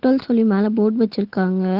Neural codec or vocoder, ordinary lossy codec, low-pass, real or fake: none; Opus, 16 kbps; 5.4 kHz; real